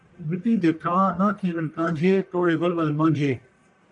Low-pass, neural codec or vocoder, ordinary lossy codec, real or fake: 10.8 kHz; codec, 44.1 kHz, 1.7 kbps, Pupu-Codec; MP3, 96 kbps; fake